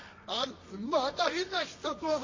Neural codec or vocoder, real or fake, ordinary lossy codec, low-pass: codec, 24 kHz, 0.9 kbps, WavTokenizer, medium music audio release; fake; MP3, 32 kbps; 7.2 kHz